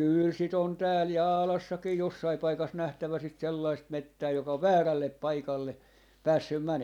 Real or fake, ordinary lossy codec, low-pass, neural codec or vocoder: real; none; 19.8 kHz; none